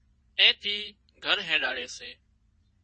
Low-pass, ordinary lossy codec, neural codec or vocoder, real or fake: 9.9 kHz; MP3, 32 kbps; vocoder, 22.05 kHz, 80 mel bands, Vocos; fake